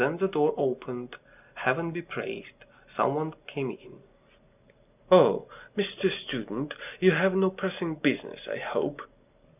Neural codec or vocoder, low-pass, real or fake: none; 3.6 kHz; real